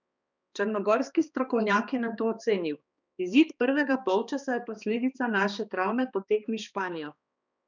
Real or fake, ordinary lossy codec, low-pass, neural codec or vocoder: fake; none; 7.2 kHz; codec, 16 kHz, 2 kbps, X-Codec, HuBERT features, trained on balanced general audio